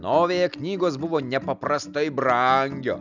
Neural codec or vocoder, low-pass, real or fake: none; 7.2 kHz; real